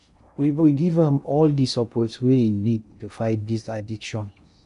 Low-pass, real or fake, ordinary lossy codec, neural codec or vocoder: 10.8 kHz; fake; none; codec, 16 kHz in and 24 kHz out, 0.6 kbps, FocalCodec, streaming, 4096 codes